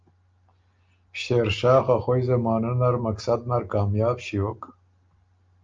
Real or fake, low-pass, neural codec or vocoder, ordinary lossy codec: real; 7.2 kHz; none; Opus, 24 kbps